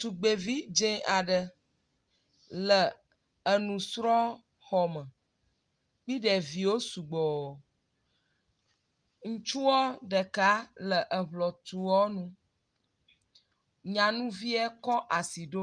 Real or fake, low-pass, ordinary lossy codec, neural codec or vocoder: real; 9.9 kHz; Opus, 32 kbps; none